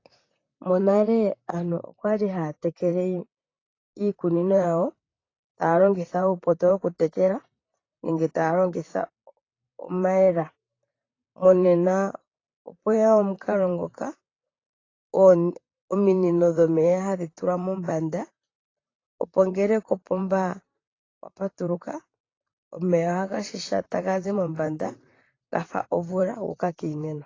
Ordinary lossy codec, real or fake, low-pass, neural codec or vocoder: AAC, 32 kbps; fake; 7.2 kHz; vocoder, 44.1 kHz, 128 mel bands, Pupu-Vocoder